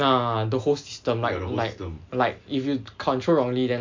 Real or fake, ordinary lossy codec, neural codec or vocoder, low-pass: real; MP3, 64 kbps; none; 7.2 kHz